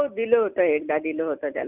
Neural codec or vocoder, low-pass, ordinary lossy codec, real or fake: none; 3.6 kHz; none; real